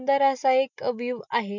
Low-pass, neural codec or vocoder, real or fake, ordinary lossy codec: 7.2 kHz; none; real; none